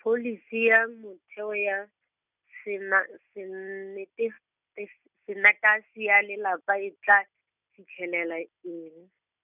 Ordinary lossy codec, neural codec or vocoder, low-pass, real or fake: none; none; 3.6 kHz; real